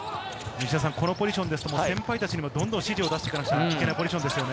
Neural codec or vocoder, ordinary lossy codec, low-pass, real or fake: none; none; none; real